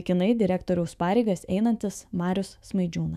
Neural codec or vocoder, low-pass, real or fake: autoencoder, 48 kHz, 128 numbers a frame, DAC-VAE, trained on Japanese speech; 14.4 kHz; fake